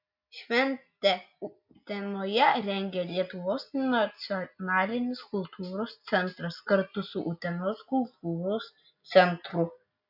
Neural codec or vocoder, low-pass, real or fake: none; 5.4 kHz; real